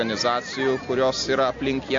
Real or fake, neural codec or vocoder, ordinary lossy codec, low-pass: real; none; AAC, 48 kbps; 7.2 kHz